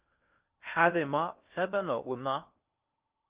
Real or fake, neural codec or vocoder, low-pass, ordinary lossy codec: fake; codec, 16 kHz in and 24 kHz out, 0.6 kbps, FocalCodec, streaming, 4096 codes; 3.6 kHz; Opus, 32 kbps